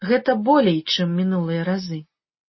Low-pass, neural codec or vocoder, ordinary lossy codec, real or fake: 7.2 kHz; none; MP3, 24 kbps; real